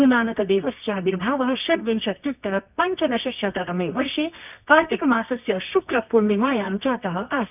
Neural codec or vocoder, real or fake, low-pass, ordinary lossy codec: codec, 24 kHz, 0.9 kbps, WavTokenizer, medium music audio release; fake; 3.6 kHz; none